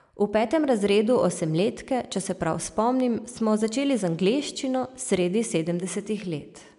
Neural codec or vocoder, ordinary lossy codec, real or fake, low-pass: none; none; real; 10.8 kHz